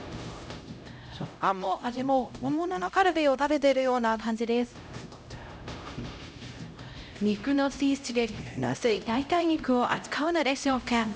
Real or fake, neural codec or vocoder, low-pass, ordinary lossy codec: fake; codec, 16 kHz, 0.5 kbps, X-Codec, HuBERT features, trained on LibriSpeech; none; none